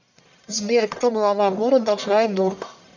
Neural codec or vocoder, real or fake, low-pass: codec, 44.1 kHz, 1.7 kbps, Pupu-Codec; fake; 7.2 kHz